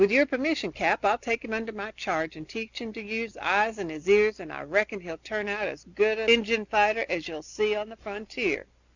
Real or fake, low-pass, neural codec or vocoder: real; 7.2 kHz; none